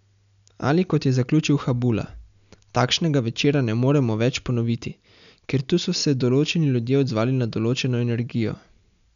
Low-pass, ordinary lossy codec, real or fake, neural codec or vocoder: 7.2 kHz; none; real; none